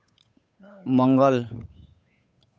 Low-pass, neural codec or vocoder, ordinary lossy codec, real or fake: none; codec, 16 kHz, 8 kbps, FunCodec, trained on Chinese and English, 25 frames a second; none; fake